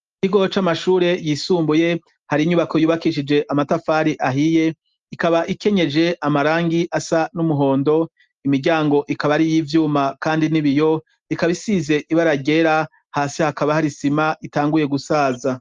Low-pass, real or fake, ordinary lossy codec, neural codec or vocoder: 7.2 kHz; real; Opus, 32 kbps; none